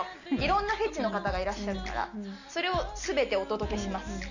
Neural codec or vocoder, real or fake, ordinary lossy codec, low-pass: none; real; none; 7.2 kHz